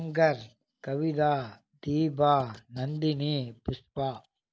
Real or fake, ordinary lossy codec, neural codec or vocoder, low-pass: real; none; none; none